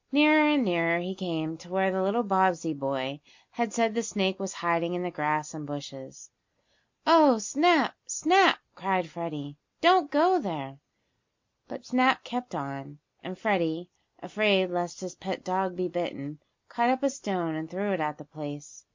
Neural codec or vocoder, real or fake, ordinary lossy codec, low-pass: none; real; MP3, 48 kbps; 7.2 kHz